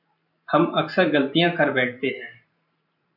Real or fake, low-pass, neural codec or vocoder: fake; 5.4 kHz; vocoder, 44.1 kHz, 128 mel bands every 512 samples, BigVGAN v2